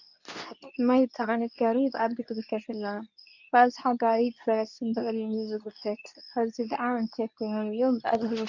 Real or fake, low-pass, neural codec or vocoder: fake; 7.2 kHz; codec, 24 kHz, 0.9 kbps, WavTokenizer, medium speech release version 2